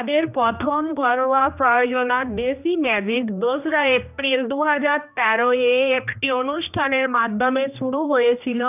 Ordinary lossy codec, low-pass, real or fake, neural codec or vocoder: none; 3.6 kHz; fake; codec, 16 kHz, 1 kbps, X-Codec, HuBERT features, trained on general audio